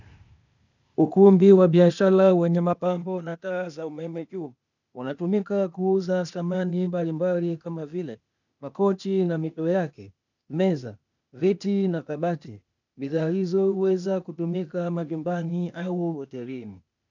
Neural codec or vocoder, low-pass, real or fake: codec, 16 kHz, 0.8 kbps, ZipCodec; 7.2 kHz; fake